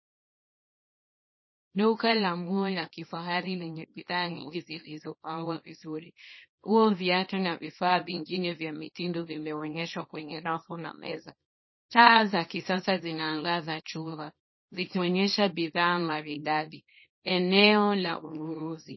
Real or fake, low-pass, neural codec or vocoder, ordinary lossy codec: fake; 7.2 kHz; codec, 24 kHz, 0.9 kbps, WavTokenizer, small release; MP3, 24 kbps